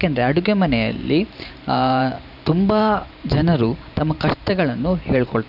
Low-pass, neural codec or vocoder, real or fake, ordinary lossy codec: 5.4 kHz; vocoder, 44.1 kHz, 128 mel bands every 512 samples, BigVGAN v2; fake; none